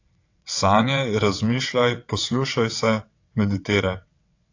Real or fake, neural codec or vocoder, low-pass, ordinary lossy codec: fake; vocoder, 22.05 kHz, 80 mel bands, WaveNeXt; 7.2 kHz; AAC, 48 kbps